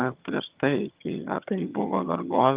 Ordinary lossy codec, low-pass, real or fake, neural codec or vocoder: Opus, 32 kbps; 3.6 kHz; fake; vocoder, 22.05 kHz, 80 mel bands, HiFi-GAN